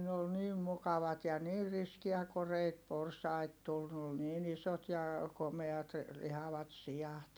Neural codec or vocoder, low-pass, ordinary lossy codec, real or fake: none; none; none; real